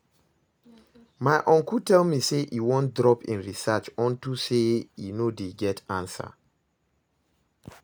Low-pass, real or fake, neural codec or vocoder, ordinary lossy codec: none; real; none; none